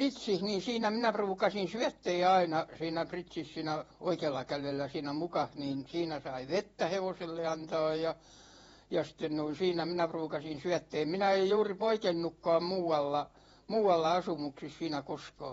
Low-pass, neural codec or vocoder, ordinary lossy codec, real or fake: 19.8 kHz; vocoder, 48 kHz, 128 mel bands, Vocos; AAC, 24 kbps; fake